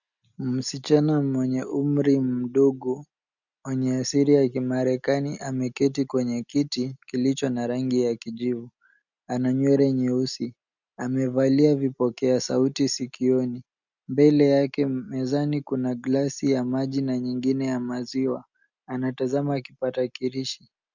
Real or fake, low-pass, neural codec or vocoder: real; 7.2 kHz; none